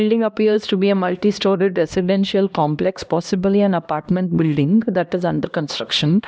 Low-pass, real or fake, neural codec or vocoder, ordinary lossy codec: none; fake; codec, 16 kHz, 1 kbps, X-Codec, HuBERT features, trained on LibriSpeech; none